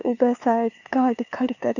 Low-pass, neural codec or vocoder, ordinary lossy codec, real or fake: 7.2 kHz; codec, 16 kHz, 2 kbps, FunCodec, trained on LibriTTS, 25 frames a second; none; fake